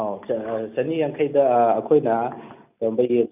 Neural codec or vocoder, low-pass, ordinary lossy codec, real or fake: none; 3.6 kHz; none; real